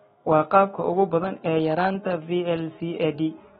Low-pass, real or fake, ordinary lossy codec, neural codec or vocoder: 19.8 kHz; fake; AAC, 16 kbps; autoencoder, 48 kHz, 128 numbers a frame, DAC-VAE, trained on Japanese speech